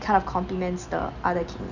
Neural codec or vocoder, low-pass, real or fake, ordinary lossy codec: none; 7.2 kHz; real; none